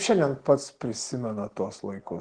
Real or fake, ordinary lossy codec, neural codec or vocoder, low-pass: real; Opus, 16 kbps; none; 9.9 kHz